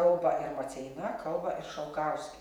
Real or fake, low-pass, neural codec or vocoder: fake; 19.8 kHz; vocoder, 48 kHz, 128 mel bands, Vocos